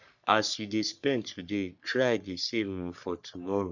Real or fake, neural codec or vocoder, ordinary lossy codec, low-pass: fake; codec, 44.1 kHz, 3.4 kbps, Pupu-Codec; none; 7.2 kHz